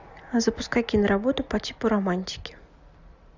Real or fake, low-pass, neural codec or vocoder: real; 7.2 kHz; none